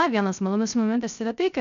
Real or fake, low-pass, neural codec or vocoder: fake; 7.2 kHz; codec, 16 kHz, 0.3 kbps, FocalCodec